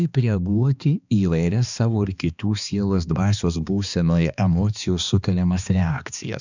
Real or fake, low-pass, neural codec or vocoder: fake; 7.2 kHz; codec, 16 kHz, 2 kbps, X-Codec, HuBERT features, trained on balanced general audio